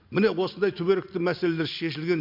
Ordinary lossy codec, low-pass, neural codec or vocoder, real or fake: MP3, 48 kbps; 5.4 kHz; none; real